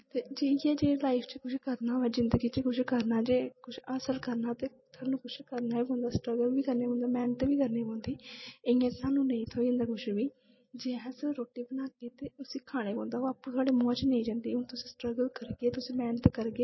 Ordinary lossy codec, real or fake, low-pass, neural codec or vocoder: MP3, 24 kbps; real; 7.2 kHz; none